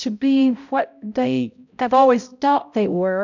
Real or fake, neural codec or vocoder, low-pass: fake; codec, 16 kHz, 0.5 kbps, X-Codec, HuBERT features, trained on balanced general audio; 7.2 kHz